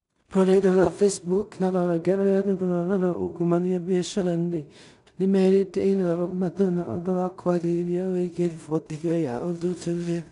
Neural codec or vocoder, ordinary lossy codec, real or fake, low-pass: codec, 16 kHz in and 24 kHz out, 0.4 kbps, LongCat-Audio-Codec, two codebook decoder; none; fake; 10.8 kHz